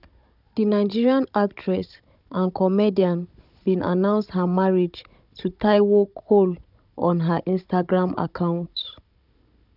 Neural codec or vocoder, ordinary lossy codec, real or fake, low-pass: codec, 16 kHz, 16 kbps, FunCodec, trained on Chinese and English, 50 frames a second; none; fake; 5.4 kHz